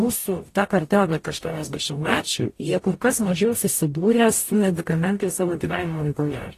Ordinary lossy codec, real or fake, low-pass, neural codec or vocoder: AAC, 48 kbps; fake; 14.4 kHz; codec, 44.1 kHz, 0.9 kbps, DAC